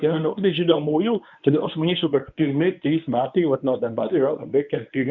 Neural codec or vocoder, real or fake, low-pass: codec, 24 kHz, 0.9 kbps, WavTokenizer, medium speech release version 1; fake; 7.2 kHz